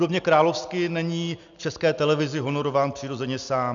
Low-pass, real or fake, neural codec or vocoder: 7.2 kHz; real; none